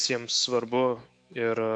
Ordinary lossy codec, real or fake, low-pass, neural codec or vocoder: AAC, 64 kbps; fake; 9.9 kHz; autoencoder, 48 kHz, 128 numbers a frame, DAC-VAE, trained on Japanese speech